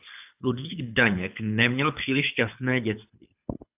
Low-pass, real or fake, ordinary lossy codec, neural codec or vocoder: 3.6 kHz; fake; AAC, 32 kbps; codec, 24 kHz, 6 kbps, HILCodec